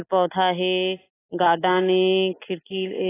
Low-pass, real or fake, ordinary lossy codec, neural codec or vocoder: 3.6 kHz; real; AAC, 16 kbps; none